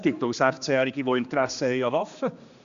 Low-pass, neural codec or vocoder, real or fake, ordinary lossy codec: 7.2 kHz; codec, 16 kHz, 2 kbps, X-Codec, HuBERT features, trained on general audio; fake; Opus, 64 kbps